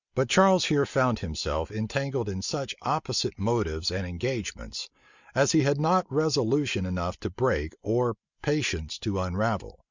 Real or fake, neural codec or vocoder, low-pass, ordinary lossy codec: real; none; 7.2 kHz; Opus, 64 kbps